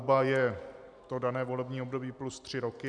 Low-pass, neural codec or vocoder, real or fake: 9.9 kHz; none; real